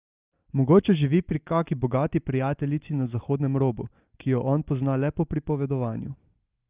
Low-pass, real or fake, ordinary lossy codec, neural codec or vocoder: 3.6 kHz; real; Opus, 32 kbps; none